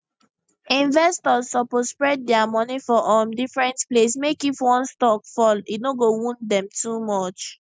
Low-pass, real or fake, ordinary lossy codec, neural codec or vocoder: none; real; none; none